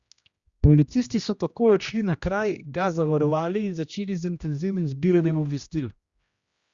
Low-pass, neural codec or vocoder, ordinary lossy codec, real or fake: 7.2 kHz; codec, 16 kHz, 1 kbps, X-Codec, HuBERT features, trained on general audio; Opus, 64 kbps; fake